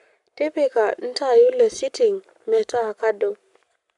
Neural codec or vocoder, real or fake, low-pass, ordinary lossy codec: codec, 44.1 kHz, 7.8 kbps, Pupu-Codec; fake; 10.8 kHz; none